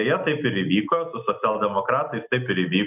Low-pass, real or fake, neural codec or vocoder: 3.6 kHz; real; none